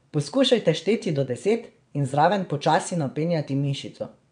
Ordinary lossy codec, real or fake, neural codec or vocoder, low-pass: MP3, 64 kbps; fake; vocoder, 22.05 kHz, 80 mel bands, WaveNeXt; 9.9 kHz